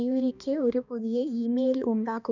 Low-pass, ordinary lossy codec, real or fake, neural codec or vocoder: 7.2 kHz; none; fake; codec, 16 kHz, 2 kbps, X-Codec, HuBERT features, trained on balanced general audio